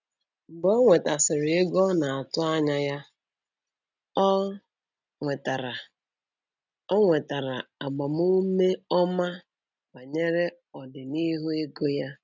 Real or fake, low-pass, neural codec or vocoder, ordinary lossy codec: real; 7.2 kHz; none; none